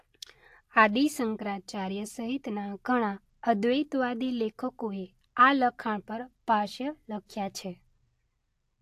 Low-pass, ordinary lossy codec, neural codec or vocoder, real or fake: 14.4 kHz; AAC, 64 kbps; none; real